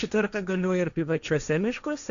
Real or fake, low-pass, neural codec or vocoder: fake; 7.2 kHz; codec, 16 kHz, 1.1 kbps, Voila-Tokenizer